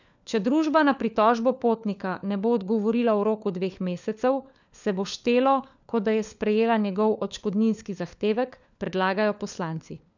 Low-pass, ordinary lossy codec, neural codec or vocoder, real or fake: 7.2 kHz; none; codec, 16 kHz, 4 kbps, FunCodec, trained on LibriTTS, 50 frames a second; fake